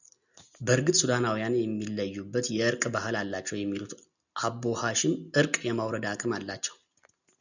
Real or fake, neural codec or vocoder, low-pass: real; none; 7.2 kHz